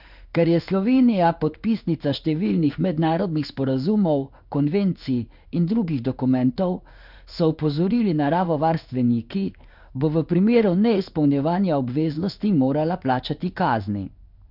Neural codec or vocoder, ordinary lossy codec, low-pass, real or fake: codec, 16 kHz in and 24 kHz out, 1 kbps, XY-Tokenizer; none; 5.4 kHz; fake